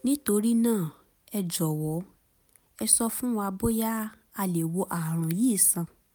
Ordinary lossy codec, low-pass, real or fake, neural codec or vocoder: none; none; real; none